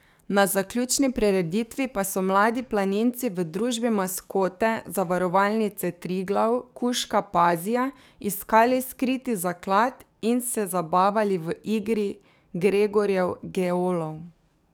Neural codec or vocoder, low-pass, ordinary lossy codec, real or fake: codec, 44.1 kHz, 7.8 kbps, DAC; none; none; fake